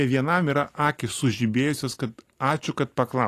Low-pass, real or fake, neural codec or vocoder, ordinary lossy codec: 14.4 kHz; real; none; AAC, 48 kbps